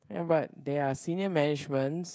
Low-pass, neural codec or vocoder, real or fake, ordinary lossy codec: none; codec, 16 kHz, 16 kbps, FreqCodec, smaller model; fake; none